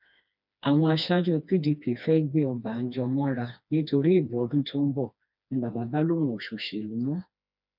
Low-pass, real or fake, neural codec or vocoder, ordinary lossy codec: 5.4 kHz; fake; codec, 16 kHz, 2 kbps, FreqCodec, smaller model; none